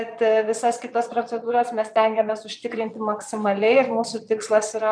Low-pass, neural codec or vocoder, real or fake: 9.9 kHz; vocoder, 22.05 kHz, 80 mel bands, Vocos; fake